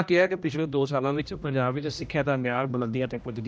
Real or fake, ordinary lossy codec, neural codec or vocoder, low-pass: fake; none; codec, 16 kHz, 1 kbps, X-Codec, HuBERT features, trained on general audio; none